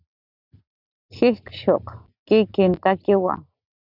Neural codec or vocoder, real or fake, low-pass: none; real; 5.4 kHz